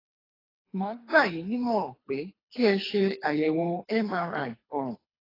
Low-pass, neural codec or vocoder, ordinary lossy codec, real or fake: 5.4 kHz; codec, 24 kHz, 3 kbps, HILCodec; AAC, 24 kbps; fake